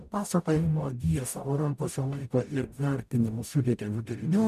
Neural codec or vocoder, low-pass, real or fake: codec, 44.1 kHz, 0.9 kbps, DAC; 14.4 kHz; fake